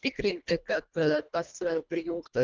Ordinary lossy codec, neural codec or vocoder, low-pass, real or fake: Opus, 24 kbps; codec, 24 kHz, 1.5 kbps, HILCodec; 7.2 kHz; fake